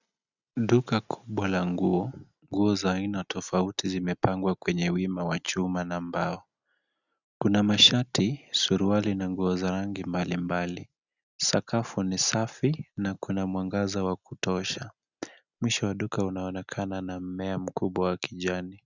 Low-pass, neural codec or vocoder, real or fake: 7.2 kHz; none; real